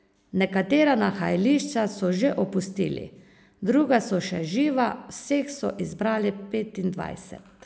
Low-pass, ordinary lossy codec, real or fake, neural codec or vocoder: none; none; real; none